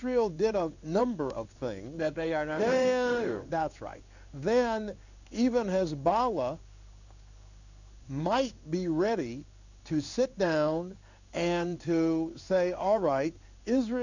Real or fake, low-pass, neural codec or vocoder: fake; 7.2 kHz; codec, 16 kHz in and 24 kHz out, 1 kbps, XY-Tokenizer